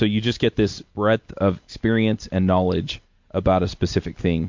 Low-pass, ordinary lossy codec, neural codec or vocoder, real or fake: 7.2 kHz; MP3, 48 kbps; none; real